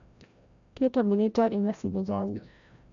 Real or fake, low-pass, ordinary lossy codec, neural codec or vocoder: fake; 7.2 kHz; none; codec, 16 kHz, 0.5 kbps, FreqCodec, larger model